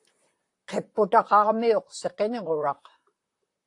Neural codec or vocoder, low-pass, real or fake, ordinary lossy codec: vocoder, 44.1 kHz, 128 mel bands, Pupu-Vocoder; 10.8 kHz; fake; AAC, 48 kbps